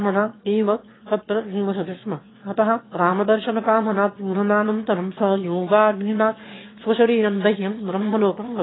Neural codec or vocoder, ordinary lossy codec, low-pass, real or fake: autoencoder, 22.05 kHz, a latent of 192 numbers a frame, VITS, trained on one speaker; AAC, 16 kbps; 7.2 kHz; fake